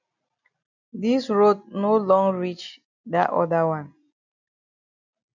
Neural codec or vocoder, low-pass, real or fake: none; 7.2 kHz; real